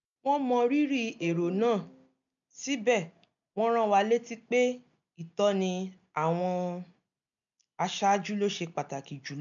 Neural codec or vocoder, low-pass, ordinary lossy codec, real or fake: none; 7.2 kHz; none; real